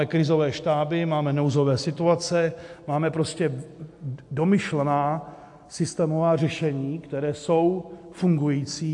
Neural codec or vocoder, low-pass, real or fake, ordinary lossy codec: none; 10.8 kHz; real; AAC, 64 kbps